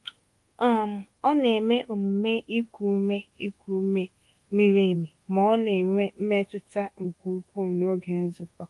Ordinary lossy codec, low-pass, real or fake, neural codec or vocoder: Opus, 24 kbps; 10.8 kHz; fake; codec, 24 kHz, 1.2 kbps, DualCodec